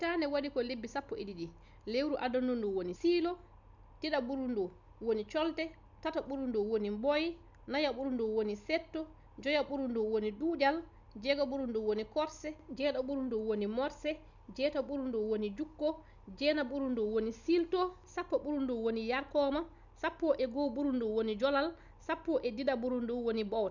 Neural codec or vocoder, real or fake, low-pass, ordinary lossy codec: none; real; 7.2 kHz; none